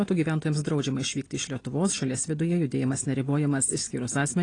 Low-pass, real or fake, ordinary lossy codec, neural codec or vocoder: 9.9 kHz; real; AAC, 32 kbps; none